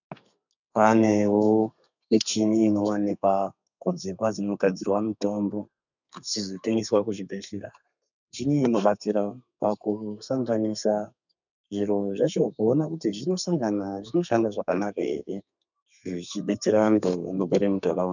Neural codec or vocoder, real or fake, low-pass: codec, 32 kHz, 1.9 kbps, SNAC; fake; 7.2 kHz